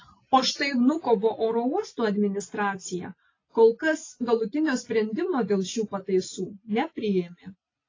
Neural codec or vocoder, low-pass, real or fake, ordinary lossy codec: none; 7.2 kHz; real; AAC, 32 kbps